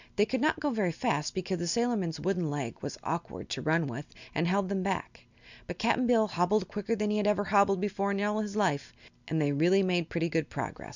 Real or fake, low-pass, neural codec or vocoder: real; 7.2 kHz; none